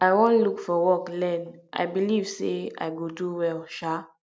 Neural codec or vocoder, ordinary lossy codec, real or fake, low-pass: none; none; real; none